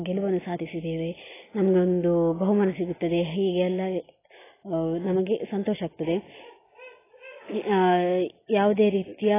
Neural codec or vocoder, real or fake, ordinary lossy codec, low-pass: none; real; AAC, 16 kbps; 3.6 kHz